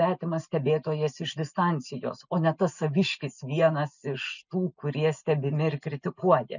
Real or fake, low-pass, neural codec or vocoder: real; 7.2 kHz; none